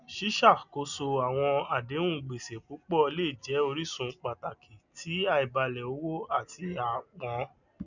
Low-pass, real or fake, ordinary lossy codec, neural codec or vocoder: 7.2 kHz; real; none; none